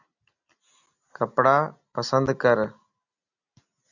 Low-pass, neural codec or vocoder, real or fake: 7.2 kHz; none; real